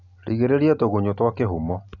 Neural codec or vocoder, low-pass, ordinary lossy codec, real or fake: none; 7.2 kHz; none; real